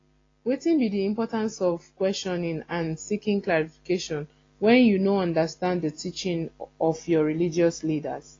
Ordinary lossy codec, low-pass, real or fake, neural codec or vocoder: AAC, 32 kbps; 7.2 kHz; real; none